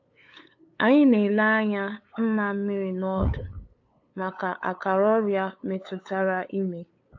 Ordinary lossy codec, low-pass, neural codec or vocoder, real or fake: AAC, 48 kbps; 7.2 kHz; codec, 16 kHz, 8 kbps, FunCodec, trained on LibriTTS, 25 frames a second; fake